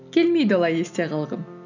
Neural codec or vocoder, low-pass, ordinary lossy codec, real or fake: none; 7.2 kHz; none; real